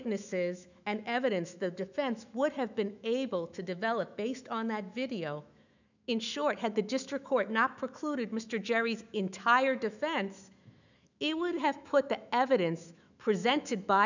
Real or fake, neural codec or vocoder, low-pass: fake; autoencoder, 48 kHz, 128 numbers a frame, DAC-VAE, trained on Japanese speech; 7.2 kHz